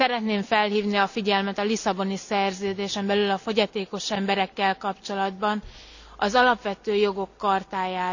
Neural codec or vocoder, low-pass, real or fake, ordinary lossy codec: none; 7.2 kHz; real; none